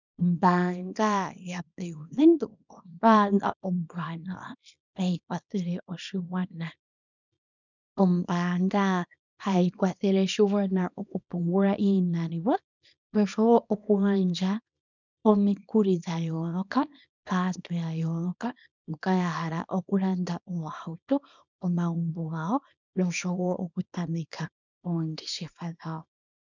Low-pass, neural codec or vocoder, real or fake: 7.2 kHz; codec, 24 kHz, 0.9 kbps, WavTokenizer, small release; fake